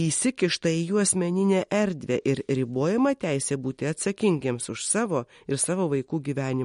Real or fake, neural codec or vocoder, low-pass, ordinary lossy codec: real; none; 10.8 kHz; MP3, 48 kbps